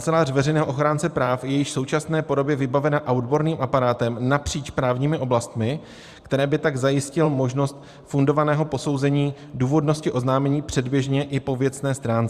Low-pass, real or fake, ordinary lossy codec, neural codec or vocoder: 14.4 kHz; fake; Opus, 64 kbps; vocoder, 44.1 kHz, 128 mel bands every 512 samples, BigVGAN v2